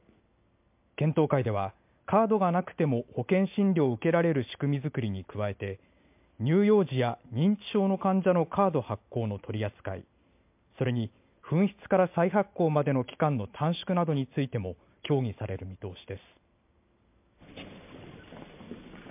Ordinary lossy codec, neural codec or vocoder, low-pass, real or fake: MP3, 32 kbps; none; 3.6 kHz; real